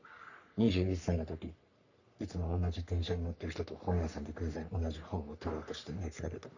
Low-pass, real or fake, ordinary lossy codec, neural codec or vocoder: 7.2 kHz; fake; none; codec, 44.1 kHz, 3.4 kbps, Pupu-Codec